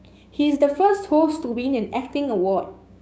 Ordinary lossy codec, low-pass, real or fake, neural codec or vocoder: none; none; fake; codec, 16 kHz, 6 kbps, DAC